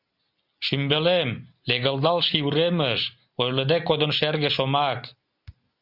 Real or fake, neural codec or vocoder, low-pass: real; none; 5.4 kHz